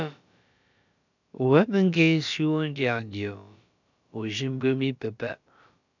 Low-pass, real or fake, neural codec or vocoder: 7.2 kHz; fake; codec, 16 kHz, about 1 kbps, DyCAST, with the encoder's durations